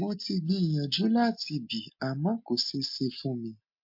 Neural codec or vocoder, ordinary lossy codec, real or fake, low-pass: none; MP3, 32 kbps; real; 5.4 kHz